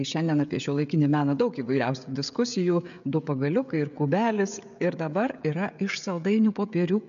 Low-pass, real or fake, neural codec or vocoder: 7.2 kHz; fake; codec, 16 kHz, 16 kbps, FreqCodec, smaller model